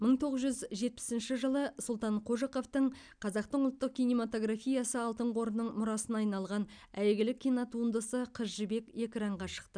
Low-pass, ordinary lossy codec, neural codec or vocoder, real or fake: 9.9 kHz; none; none; real